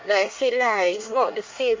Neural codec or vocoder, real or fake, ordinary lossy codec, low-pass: codec, 24 kHz, 1 kbps, SNAC; fake; MP3, 48 kbps; 7.2 kHz